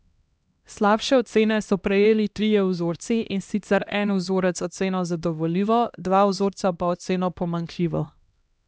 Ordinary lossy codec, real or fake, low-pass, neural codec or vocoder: none; fake; none; codec, 16 kHz, 1 kbps, X-Codec, HuBERT features, trained on LibriSpeech